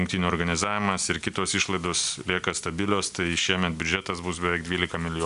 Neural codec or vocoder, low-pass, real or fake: none; 10.8 kHz; real